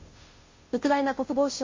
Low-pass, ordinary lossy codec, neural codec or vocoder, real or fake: 7.2 kHz; MP3, 32 kbps; codec, 16 kHz, 0.5 kbps, FunCodec, trained on Chinese and English, 25 frames a second; fake